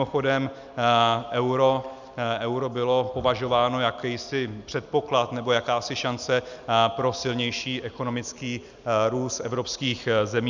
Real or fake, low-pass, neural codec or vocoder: real; 7.2 kHz; none